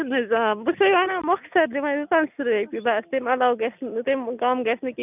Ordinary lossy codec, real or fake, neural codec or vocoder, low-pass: none; real; none; 3.6 kHz